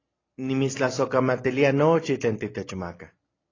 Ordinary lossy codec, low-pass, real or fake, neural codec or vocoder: AAC, 32 kbps; 7.2 kHz; real; none